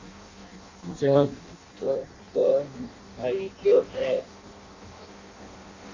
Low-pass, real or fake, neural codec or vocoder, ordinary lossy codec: 7.2 kHz; fake; codec, 16 kHz in and 24 kHz out, 0.6 kbps, FireRedTTS-2 codec; AAC, 32 kbps